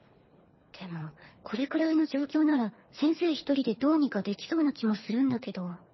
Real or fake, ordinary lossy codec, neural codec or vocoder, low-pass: fake; MP3, 24 kbps; codec, 24 kHz, 3 kbps, HILCodec; 7.2 kHz